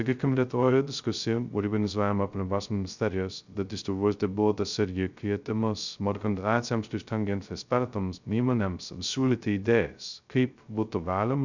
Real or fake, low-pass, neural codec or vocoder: fake; 7.2 kHz; codec, 16 kHz, 0.2 kbps, FocalCodec